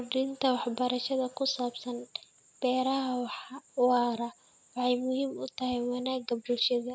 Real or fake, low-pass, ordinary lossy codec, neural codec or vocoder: real; none; none; none